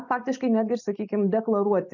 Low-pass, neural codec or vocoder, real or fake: 7.2 kHz; none; real